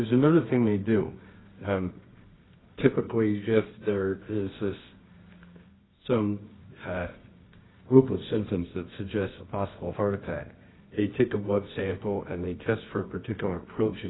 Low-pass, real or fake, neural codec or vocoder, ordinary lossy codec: 7.2 kHz; fake; codec, 24 kHz, 0.9 kbps, WavTokenizer, medium music audio release; AAC, 16 kbps